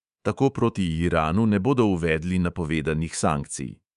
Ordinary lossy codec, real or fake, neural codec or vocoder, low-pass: none; fake; codec, 24 kHz, 3.1 kbps, DualCodec; 10.8 kHz